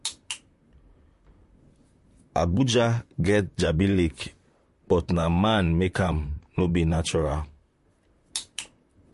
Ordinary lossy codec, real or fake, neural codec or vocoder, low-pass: MP3, 48 kbps; fake; vocoder, 44.1 kHz, 128 mel bands, Pupu-Vocoder; 14.4 kHz